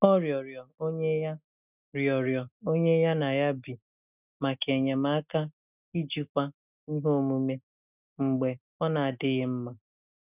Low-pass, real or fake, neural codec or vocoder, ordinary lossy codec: 3.6 kHz; real; none; none